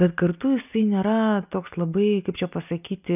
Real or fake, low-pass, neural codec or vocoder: real; 3.6 kHz; none